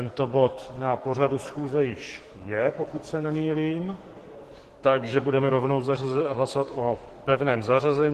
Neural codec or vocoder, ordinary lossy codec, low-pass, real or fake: codec, 44.1 kHz, 2.6 kbps, SNAC; Opus, 16 kbps; 14.4 kHz; fake